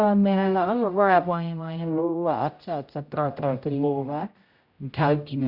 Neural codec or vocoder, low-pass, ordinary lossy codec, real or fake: codec, 16 kHz, 0.5 kbps, X-Codec, HuBERT features, trained on general audio; 5.4 kHz; Opus, 64 kbps; fake